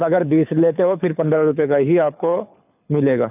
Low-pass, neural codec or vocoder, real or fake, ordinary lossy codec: 3.6 kHz; codec, 24 kHz, 6 kbps, HILCodec; fake; none